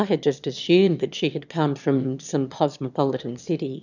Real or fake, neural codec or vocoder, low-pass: fake; autoencoder, 22.05 kHz, a latent of 192 numbers a frame, VITS, trained on one speaker; 7.2 kHz